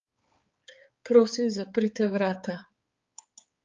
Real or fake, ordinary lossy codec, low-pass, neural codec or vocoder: fake; Opus, 32 kbps; 7.2 kHz; codec, 16 kHz, 4 kbps, X-Codec, HuBERT features, trained on balanced general audio